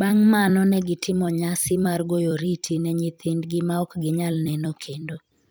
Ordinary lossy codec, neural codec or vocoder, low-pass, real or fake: none; vocoder, 44.1 kHz, 128 mel bands every 256 samples, BigVGAN v2; none; fake